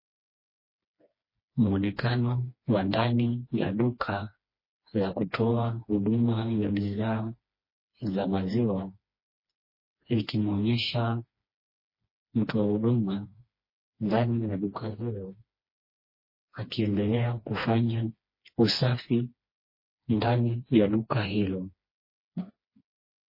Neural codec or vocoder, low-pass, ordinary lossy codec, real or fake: codec, 16 kHz, 2 kbps, FreqCodec, smaller model; 5.4 kHz; MP3, 24 kbps; fake